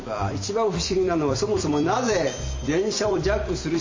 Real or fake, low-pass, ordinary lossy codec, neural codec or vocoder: fake; 7.2 kHz; MP3, 32 kbps; vocoder, 44.1 kHz, 128 mel bands every 256 samples, BigVGAN v2